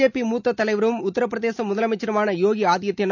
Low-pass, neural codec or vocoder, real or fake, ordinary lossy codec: 7.2 kHz; none; real; none